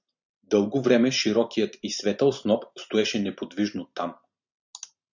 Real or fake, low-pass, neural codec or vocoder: fake; 7.2 kHz; vocoder, 44.1 kHz, 128 mel bands every 512 samples, BigVGAN v2